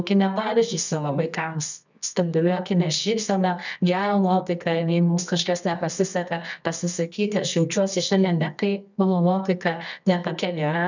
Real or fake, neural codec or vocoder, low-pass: fake; codec, 24 kHz, 0.9 kbps, WavTokenizer, medium music audio release; 7.2 kHz